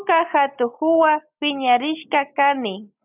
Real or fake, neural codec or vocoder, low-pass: real; none; 3.6 kHz